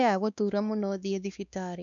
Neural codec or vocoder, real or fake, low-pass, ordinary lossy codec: codec, 16 kHz, 2 kbps, X-Codec, WavLM features, trained on Multilingual LibriSpeech; fake; 7.2 kHz; none